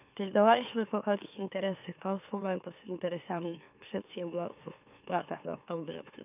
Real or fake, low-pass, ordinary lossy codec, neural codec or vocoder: fake; 3.6 kHz; none; autoencoder, 44.1 kHz, a latent of 192 numbers a frame, MeloTTS